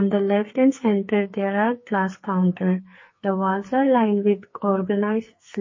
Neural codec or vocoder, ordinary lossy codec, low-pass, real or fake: codec, 44.1 kHz, 2.6 kbps, SNAC; MP3, 32 kbps; 7.2 kHz; fake